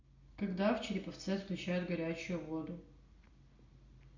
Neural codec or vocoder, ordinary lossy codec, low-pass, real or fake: none; MP3, 64 kbps; 7.2 kHz; real